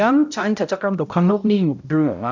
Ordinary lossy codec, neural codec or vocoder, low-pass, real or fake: MP3, 64 kbps; codec, 16 kHz, 0.5 kbps, X-Codec, HuBERT features, trained on balanced general audio; 7.2 kHz; fake